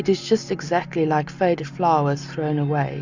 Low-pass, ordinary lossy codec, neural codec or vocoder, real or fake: 7.2 kHz; Opus, 64 kbps; none; real